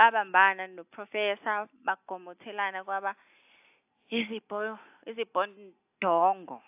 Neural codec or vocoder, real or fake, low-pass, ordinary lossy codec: none; real; 3.6 kHz; AAC, 32 kbps